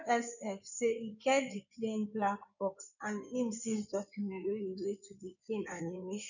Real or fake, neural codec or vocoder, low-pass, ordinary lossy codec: fake; codec, 16 kHz in and 24 kHz out, 2.2 kbps, FireRedTTS-2 codec; 7.2 kHz; none